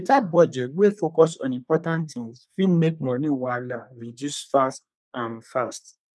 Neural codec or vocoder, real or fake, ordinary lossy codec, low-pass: codec, 24 kHz, 1 kbps, SNAC; fake; none; none